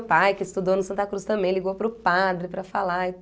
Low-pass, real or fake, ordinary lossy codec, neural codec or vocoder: none; real; none; none